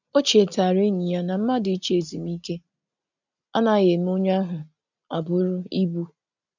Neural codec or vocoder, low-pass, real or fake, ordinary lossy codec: none; 7.2 kHz; real; none